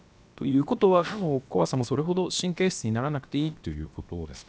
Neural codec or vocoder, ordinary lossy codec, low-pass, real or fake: codec, 16 kHz, about 1 kbps, DyCAST, with the encoder's durations; none; none; fake